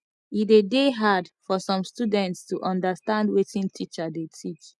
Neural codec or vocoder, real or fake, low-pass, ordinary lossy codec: none; real; none; none